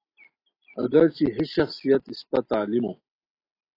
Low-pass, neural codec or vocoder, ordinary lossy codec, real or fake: 5.4 kHz; none; AAC, 24 kbps; real